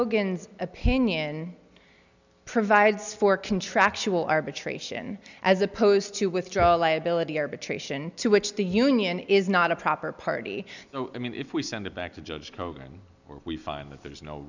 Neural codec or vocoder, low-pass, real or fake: none; 7.2 kHz; real